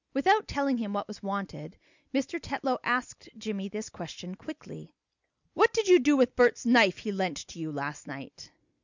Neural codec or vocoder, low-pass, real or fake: none; 7.2 kHz; real